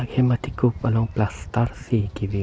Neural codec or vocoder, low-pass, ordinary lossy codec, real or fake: none; none; none; real